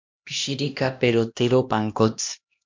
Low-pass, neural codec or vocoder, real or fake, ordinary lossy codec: 7.2 kHz; codec, 16 kHz, 1 kbps, X-Codec, HuBERT features, trained on LibriSpeech; fake; MP3, 48 kbps